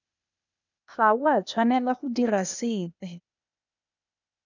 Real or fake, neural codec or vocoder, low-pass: fake; codec, 16 kHz, 0.8 kbps, ZipCodec; 7.2 kHz